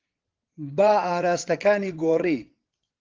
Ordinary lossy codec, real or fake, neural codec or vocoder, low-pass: Opus, 16 kbps; fake; vocoder, 22.05 kHz, 80 mel bands, WaveNeXt; 7.2 kHz